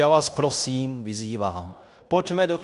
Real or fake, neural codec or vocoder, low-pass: fake; codec, 16 kHz in and 24 kHz out, 0.9 kbps, LongCat-Audio-Codec, fine tuned four codebook decoder; 10.8 kHz